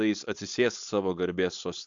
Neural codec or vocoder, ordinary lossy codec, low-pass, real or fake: codec, 16 kHz, 4.8 kbps, FACodec; AAC, 64 kbps; 7.2 kHz; fake